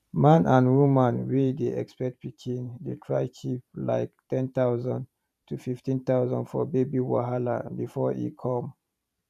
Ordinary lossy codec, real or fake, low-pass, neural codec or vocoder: none; fake; 14.4 kHz; vocoder, 44.1 kHz, 128 mel bands every 512 samples, BigVGAN v2